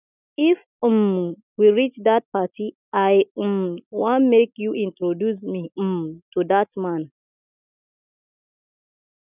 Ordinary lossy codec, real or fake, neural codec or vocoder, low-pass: none; real; none; 3.6 kHz